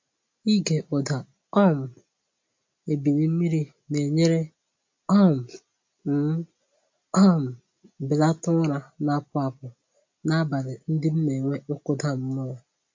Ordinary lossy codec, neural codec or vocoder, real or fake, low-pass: MP3, 48 kbps; none; real; 7.2 kHz